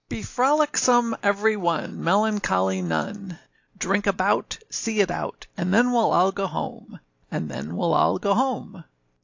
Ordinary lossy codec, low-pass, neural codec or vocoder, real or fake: AAC, 48 kbps; 7.2 kHz; none; real